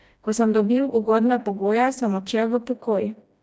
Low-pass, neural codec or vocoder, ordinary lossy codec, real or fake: none; codec, 16 kHz, 1 kbps, FreqCodec, smaller model; none; fake